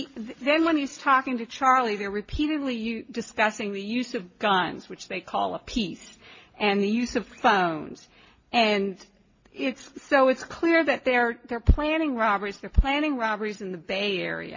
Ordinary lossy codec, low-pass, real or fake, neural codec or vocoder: MP3, 32 kbps; 7.2 kHz; real; none